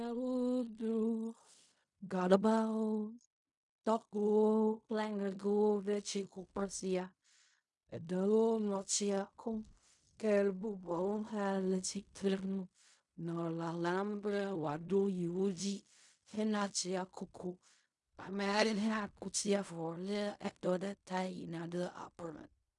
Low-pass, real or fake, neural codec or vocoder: 10.8 kHz; fake; codec, 16 kHz in and 24 kHz out, 0.4 kbps, LongCat-Audio-Codec, fine tuned four codebook decoder